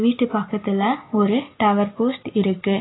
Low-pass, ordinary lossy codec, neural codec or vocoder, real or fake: 7.2 kHz; AAC, 16 kbps; none; real